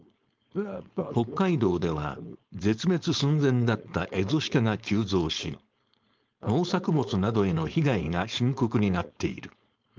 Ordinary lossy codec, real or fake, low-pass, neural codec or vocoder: Opus, 24 kbps; fake; 7.2 kHz; codec, 16 kHz, 4.8 kbps, FACodec